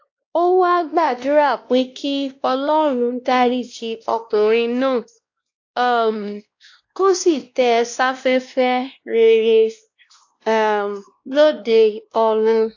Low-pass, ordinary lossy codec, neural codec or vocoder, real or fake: 7.2 kHz; AAC, 48 kbps; codec, 16 kHz, 1 kbps, X-Codec, WavLM features, trained on Multilingual LibriSpeech; fake